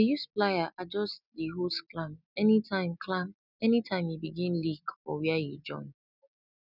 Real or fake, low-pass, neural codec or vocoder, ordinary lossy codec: real; 5.4 kHz; none; none